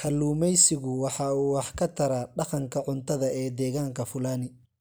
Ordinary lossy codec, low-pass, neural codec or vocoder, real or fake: none; none; none; real